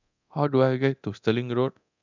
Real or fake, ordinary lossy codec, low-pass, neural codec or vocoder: fake; none; 7.2 kHz; codec, 24 kHz, 0.9 kbps, DualCodec